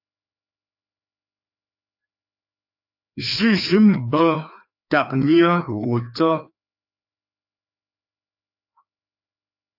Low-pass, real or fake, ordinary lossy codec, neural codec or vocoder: 5.4 kHz; fake; AAC, 48 kbps; codec, 16 kHz, 2 kbps, FreqCodec, larger model